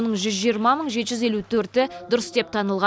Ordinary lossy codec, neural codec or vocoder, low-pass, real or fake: none; none; none; real